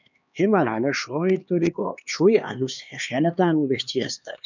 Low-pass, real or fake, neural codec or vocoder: 7.2 kHz; fake; codec, 16 kHz, 2 kbps, X-Codec, HuBERT features, trained on LibriSpeech